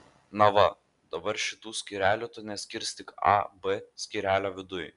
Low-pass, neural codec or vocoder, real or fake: 10.8 kHz; none; real